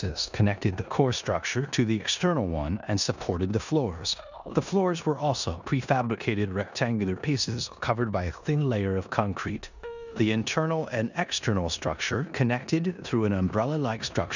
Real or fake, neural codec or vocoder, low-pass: fake; codec, 16 kHz in and 24 kHz out, 0.9 kbps, LongCat-Audio-Codec, four codebook decoder; 7.2 kHz